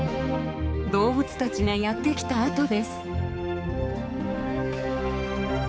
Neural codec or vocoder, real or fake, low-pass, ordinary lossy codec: codec, 16 kHz, 4 kbps, X-Codec, HuBERT features, trained on balanced general audio; fake; none; none